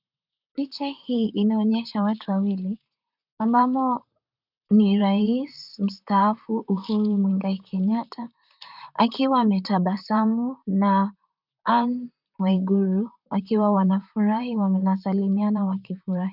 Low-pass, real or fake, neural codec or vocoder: 5.4 kHz; fake; vocoder, 44.1 kHz, 128 mel bands, Pupu-Vocoder